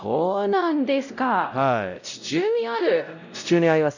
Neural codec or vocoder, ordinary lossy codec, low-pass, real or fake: codec, 16 kHz, 0.5 kbps, X-Codec, WavLM features, trained on Multilingual LibriSpeech; none; 7.2 kHz; fake